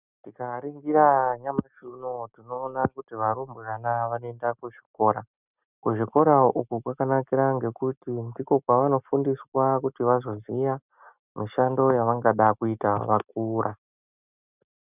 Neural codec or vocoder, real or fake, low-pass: none; real; 3.6 kHz